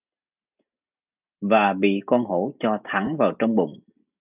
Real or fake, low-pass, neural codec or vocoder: real; 3.6 kHz; none